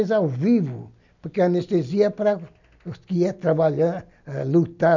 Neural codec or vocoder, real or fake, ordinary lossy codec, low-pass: none; real; none; 7.2 kHz